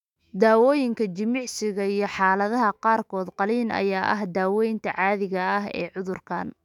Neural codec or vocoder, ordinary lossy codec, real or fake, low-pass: autoencoder, 48 kHz, 128 numbers a frame, DAC-VAE, trained on Japanese speech; none; fake; 19.8 kHz